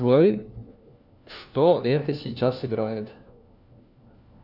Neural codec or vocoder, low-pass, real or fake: codec, 16 kHz, 1 kbps, FunCodec, trained on LibriTTS, 50 frames a second; 5.4 kHz; fake